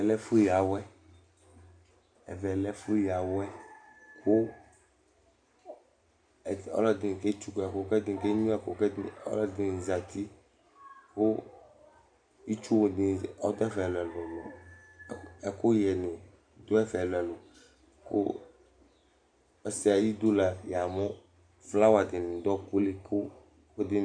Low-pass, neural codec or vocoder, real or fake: 9.9 kHz; none; real